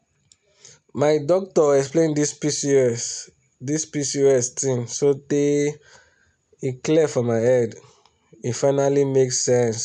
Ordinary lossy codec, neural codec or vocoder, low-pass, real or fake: none; none; 10.8 kHz; real